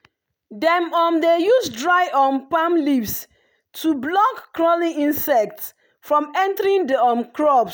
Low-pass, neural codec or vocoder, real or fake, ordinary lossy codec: none; none; real; none